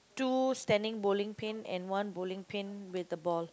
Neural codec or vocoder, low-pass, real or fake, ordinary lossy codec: none; none; real; none